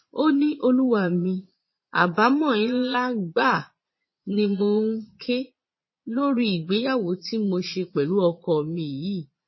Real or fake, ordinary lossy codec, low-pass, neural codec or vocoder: fake; MP3, 24 kbps; 7.2 kHz; vocoder, 22.05 kHz, 80 mel bands, Vocos